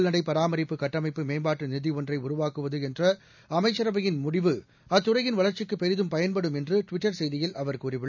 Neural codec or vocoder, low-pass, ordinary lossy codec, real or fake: none; 7.2 kHz; none; real